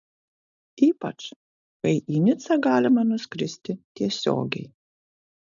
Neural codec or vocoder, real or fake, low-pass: none; real; 7.2 kHz